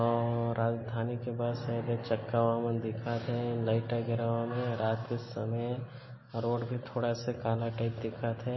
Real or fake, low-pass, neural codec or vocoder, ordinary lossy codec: real; 7.2 kHz; none; MP3, 24 kbps